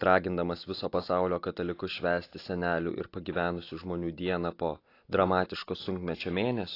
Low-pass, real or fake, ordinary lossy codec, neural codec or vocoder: 5.4 kHz; real; AAC, 32 kbps; none